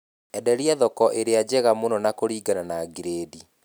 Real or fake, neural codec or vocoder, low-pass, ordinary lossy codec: real; none; none; none